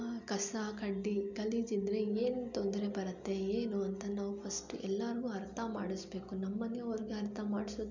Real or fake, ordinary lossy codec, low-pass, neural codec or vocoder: real; none; 7.2 kHz; none